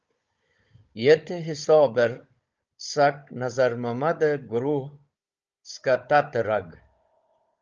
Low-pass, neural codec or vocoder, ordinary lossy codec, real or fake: 7.2 kHz; codec, 16 kHz, 16 kbps, FunCodec, trained on Chinese and English, 50 frames a second; Opus, 24 kbps; fake